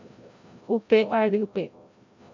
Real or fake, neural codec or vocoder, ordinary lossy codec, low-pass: fake; codec, 16 kHz, 0.5 kbps, FreqCodec, larger model; MP3, 48 kbps; 7.2 kHz